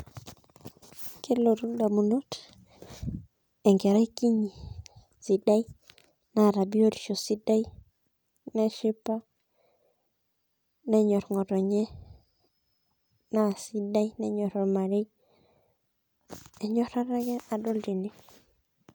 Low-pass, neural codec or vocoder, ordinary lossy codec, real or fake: none; none; none; real